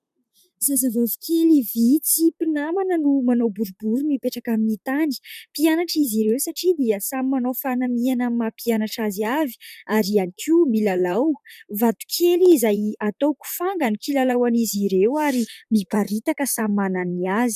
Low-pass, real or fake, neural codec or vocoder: 14.4 kHz; fake; vocoder, 44.1 kHz, 128 mel bands, Pupu-Vocoder